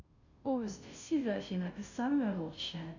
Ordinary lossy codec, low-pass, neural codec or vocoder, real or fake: none; 7.2 kHz; codec, 16 kHz, 0.5 kbps, FunCodec, trained on Chinese and English, 25 frames a second; fake